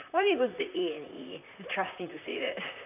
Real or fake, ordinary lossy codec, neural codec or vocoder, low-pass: fake; none; vocoder, 44.1 kHz, 128 mel bands, Pupu-Vocoder; 3.6 kHz